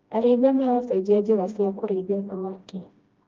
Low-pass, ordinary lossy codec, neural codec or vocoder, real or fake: 7.2 kHz; Opus, 32 kbps; codec, 16 kHz, 1 kbps, FreqCodec, smaller model; fake